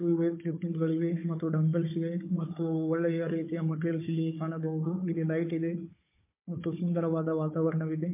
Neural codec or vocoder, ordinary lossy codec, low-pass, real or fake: codec, 16 kHz, 4 kbps, FunCodec, trained on Chinese and English, 50 frames a second; MP3, 24 kbps; 3.6 kHz; fake